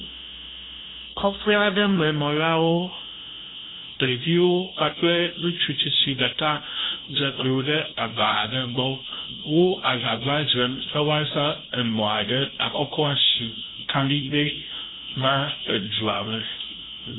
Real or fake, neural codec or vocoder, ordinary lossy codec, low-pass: fake; codec, 16 kHz, 0.5 kbps, FunCodec, trained on LibriTTS, 25 frames a second; AAC, 16 kbps; 7.2 kHz